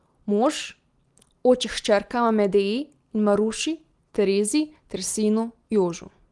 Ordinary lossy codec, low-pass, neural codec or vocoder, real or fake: Opus, 24 kbps; 10.8 kHz; none; real